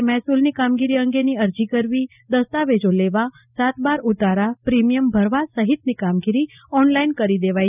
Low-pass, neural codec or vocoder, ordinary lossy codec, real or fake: 3.6 kHz; none; none; real